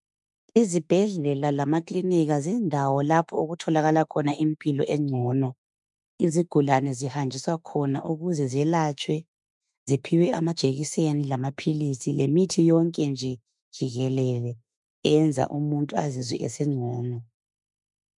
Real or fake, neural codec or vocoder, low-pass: fake; autoencoder, 48 kHz, 32 numbers a frame, DAC-VAE, trained on Japanese speech; 10.8 kHz